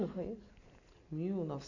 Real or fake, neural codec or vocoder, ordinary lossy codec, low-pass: real; none; none; 7.2 kHz